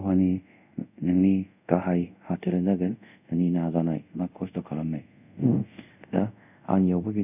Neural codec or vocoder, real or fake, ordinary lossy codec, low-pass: codec, 24 kHz, 0.5 kbps, DualCodec; fake; none; 3.6 kHz